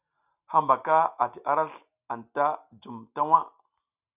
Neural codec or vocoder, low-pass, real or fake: none; 3.6 kHz; real